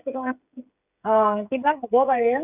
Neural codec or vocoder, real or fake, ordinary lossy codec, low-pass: codec, 16 kHz, 16 kbps, FreqCodec, smaller model; fake; none; 3.6 kHz